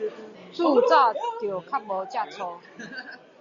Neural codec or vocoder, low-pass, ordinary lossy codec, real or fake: none; 7.2 kHz; Opus, 64 kbps; real